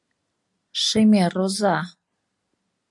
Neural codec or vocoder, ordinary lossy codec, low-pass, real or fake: none; AAC, 64 kbps; 10.8 kHz; real